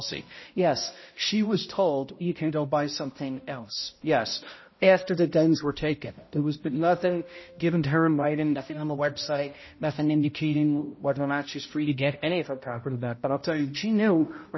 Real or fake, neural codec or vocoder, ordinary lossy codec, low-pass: fake; codec, 16 kHz, 0.5 kbps, X-Codec, HuBERT features, trained on balanced general audio; MP3, 24 kbps; 7.2 kHz